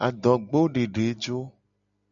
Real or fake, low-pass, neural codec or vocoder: real; 7.2 kHz; none